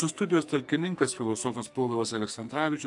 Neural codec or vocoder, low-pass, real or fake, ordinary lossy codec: codec, 44.1 kHz, 2.6 kbps, SNAC; 10.8 kHz; fake; AAC, 64 kbps